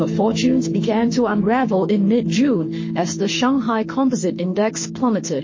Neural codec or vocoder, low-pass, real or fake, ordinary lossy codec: autoencoder, 48 kHz, 32 numbers a frame, DAC-VAE, trained on Japanese speech; 7.2 kHz; fake; MP3, 32 kbps